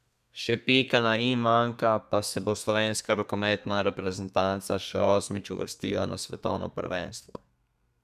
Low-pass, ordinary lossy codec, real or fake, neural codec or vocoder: 14.4 kHz; none; fake; codec, 32 kHz, 1.9 kbps, SNAC